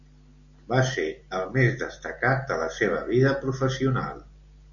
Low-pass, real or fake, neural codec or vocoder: 7.2 kHz; real; none